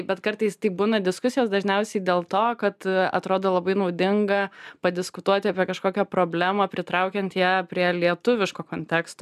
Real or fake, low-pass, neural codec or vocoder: real; 14.4 kHz; none